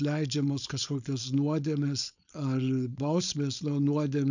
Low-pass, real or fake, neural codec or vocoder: 7.2 kHz; fake; codec, 16 kHz, 4.8 kbps, FACodec